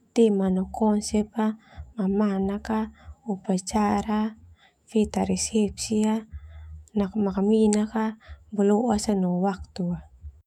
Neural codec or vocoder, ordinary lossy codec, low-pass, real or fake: autoencoder, 48 kHz, 128 numbers a frame, DAC-VAE, trained on Japanese speech; none; 19.8 kHz; fake